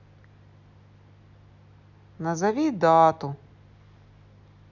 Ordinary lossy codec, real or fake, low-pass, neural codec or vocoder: none; real; 7.2 kHz; none